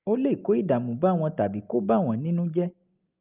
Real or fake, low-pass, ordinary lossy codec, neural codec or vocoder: real; 3.6 kHz; Opus, 24 kbps; none